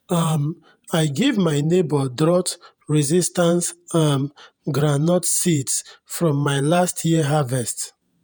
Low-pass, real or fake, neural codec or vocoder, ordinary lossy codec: none; fake; vocoder, 48 kHz, 128 mel bands, Vocos; none